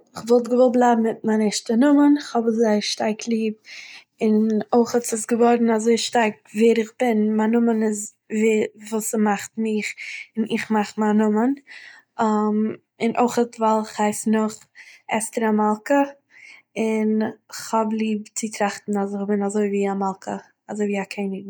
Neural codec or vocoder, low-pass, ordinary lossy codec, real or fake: none; none; none; real